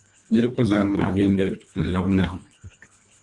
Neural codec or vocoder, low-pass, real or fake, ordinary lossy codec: codec, 24 kHz, 1.5 kbps, HILCodec; 10.8 kHz; fake; Opus, 64 kbps